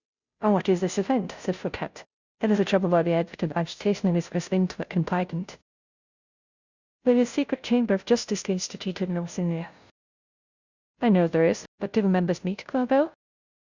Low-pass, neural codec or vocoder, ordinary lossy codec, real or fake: 7.2 kHz; codec, 16 kHz, 0.5 kbps, FunCodec, trained on Chinese and English, 25 frames a second; Opus, 64 kbps; fake